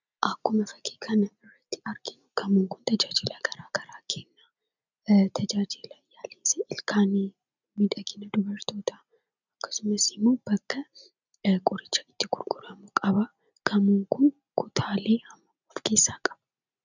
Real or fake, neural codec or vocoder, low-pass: real; none; 7.2 kHz